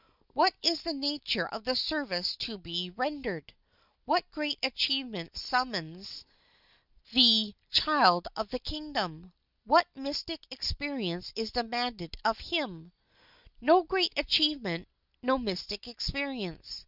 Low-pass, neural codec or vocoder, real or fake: 5.4 kHz; none; real